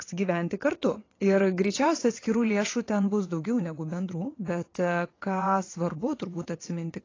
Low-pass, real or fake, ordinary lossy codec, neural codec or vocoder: 7.2 kHz; fake; AAC, 32 kbps; vocoder, 22.05 kHz, 80 mel bands, WaveNeXt